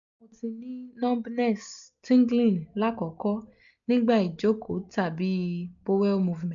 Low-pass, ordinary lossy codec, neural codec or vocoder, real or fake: 7.2 kHz; none; none; real